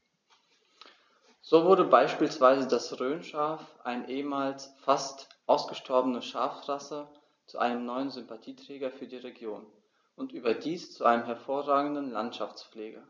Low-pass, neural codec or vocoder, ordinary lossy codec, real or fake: none; none; none; real